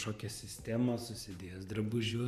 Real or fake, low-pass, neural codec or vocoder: fake; 14.4 kHz; vocoder, 44.1 kHz, 128 mel bands every 512 samples, BigVGAN v2